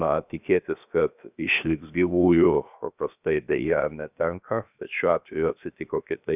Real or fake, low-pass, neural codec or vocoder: fake; 3.6 kHz; codec, 16 kHz, about 1 kbps, DyCAST, with the encoder's durations